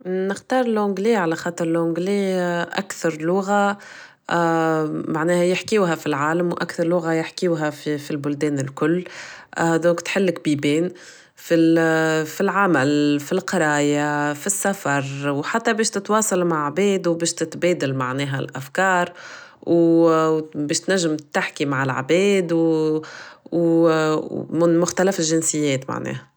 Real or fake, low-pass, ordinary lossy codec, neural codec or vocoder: real; none; none; none